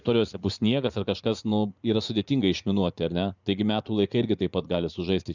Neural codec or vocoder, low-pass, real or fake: vocoder, 44.1 kHz, 80 mel bands, Vocos; 7.2 kHz; fake